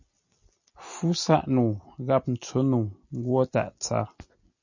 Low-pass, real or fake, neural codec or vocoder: 7.2 kHz; real; none